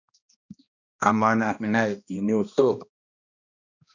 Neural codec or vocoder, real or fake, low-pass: codec, 16 kHz, 1 kbps, X-Codec, HuBERT features, trained on balanced general audio; fake; 7.2 kHz